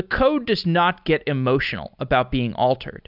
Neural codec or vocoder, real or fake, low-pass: none; real; 5.4 kHz